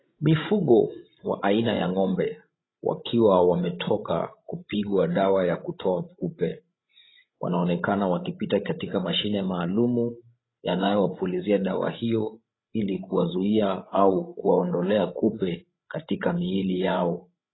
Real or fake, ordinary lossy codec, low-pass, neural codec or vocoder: fake; AAC, 16 kbps; 7.2 kHz; codec, 16 kHz, 16 kbps, FreqCodec, larger model